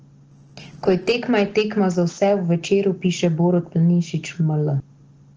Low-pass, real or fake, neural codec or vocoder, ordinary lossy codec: 7.2 kHz; real; none; Opus, 16 kbps